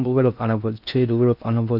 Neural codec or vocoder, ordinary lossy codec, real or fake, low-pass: codec, 16 kHz in and 24 kHz out, 0.6 kbps, FocalCodec, streaming, 4096 codes; none; fake; 5.4 kHz